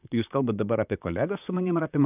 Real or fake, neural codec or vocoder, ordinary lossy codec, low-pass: fake; vocoder, 44.1 kHz, 128 mel bands, Pupu-Vocoder; AAC, 32 kbps; 3.6 kHz